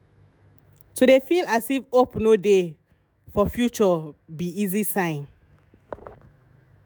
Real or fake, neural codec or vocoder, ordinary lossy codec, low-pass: fake; autoencoder, 48 kHz, 128 numbers a frame, DAC-VAE, trained on Japanese speech; none; none